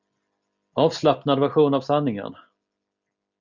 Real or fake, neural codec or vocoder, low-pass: real; none; 7.2 kHz